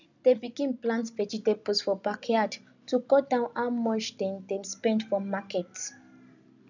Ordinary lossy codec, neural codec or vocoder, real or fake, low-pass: none; none; real; 7.2 kHz